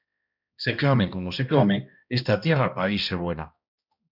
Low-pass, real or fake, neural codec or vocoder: 5.4 kHz; fake; codec, 16 kHz, 1 kbps, X-Codec, HuBERT features, trained on balanced general audio